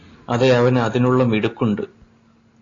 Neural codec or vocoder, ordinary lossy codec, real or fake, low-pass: none; AAC, 32 kbps; real; 7.2 kHz